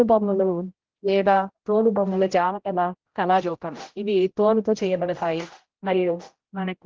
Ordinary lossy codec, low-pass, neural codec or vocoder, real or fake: Opus, 16 kbps; 7.2 kHz; codec, 16 kHz, 0.5 kbps, X-Codec, HuBERT features, trained on general audio; fake